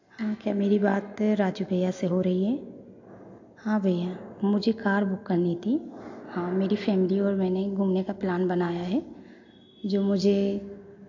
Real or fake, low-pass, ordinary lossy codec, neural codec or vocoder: real; 7.2 kHz; AAC, 48 kbps; none